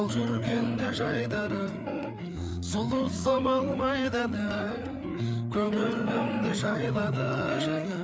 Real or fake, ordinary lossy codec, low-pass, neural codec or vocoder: fake; none; none; codec, 16 kHz, 4 kbps, FreqCodec, larger model